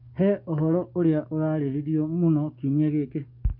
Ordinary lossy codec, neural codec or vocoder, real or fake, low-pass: none; codec, 44.1 kHz, 7.8 kbps, DAC; fake; 5.4 kHz